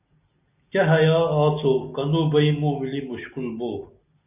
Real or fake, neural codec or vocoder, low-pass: real; none; 3.6 kHz